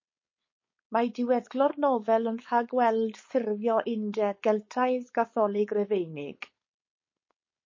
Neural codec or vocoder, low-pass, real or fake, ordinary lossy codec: codec, 16 kHz, 4.8 kbps, FACodec; 7.2 kHz; fake; MP3, 32 kbps